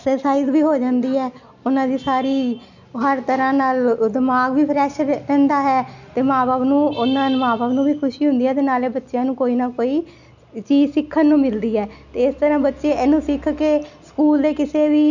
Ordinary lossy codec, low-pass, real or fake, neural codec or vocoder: none; 7.2 kHz; real; none